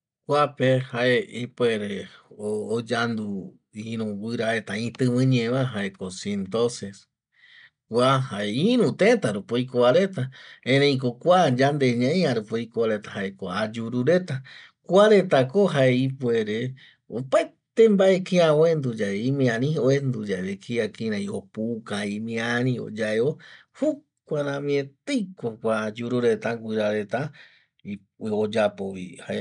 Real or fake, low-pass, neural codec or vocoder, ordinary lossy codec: real; 9.9 kHz; none; none